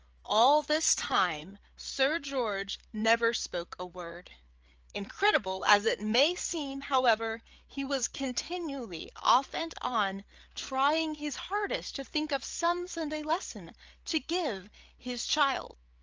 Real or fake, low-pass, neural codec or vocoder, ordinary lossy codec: fake; 7.2 kHz; codec, 16 kHz, 8 kbps, FreqCodec, larger model; Opus, 24 kbps